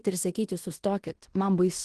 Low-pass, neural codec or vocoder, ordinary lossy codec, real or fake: 10.8 kHz; codec, 16 kHz in and 24 kHz out, 0.9 kbps, LongCat-Audio-Codec, fine tuned four codebook decoder; Opus, 16 kbps; fake